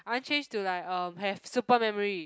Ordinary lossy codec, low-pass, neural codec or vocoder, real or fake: none; none; none; real